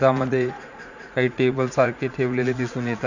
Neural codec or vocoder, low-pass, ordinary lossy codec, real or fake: vocoder, 44.1 kHz, 80 mel bands, Vocos; 7.2 kHz; none; fake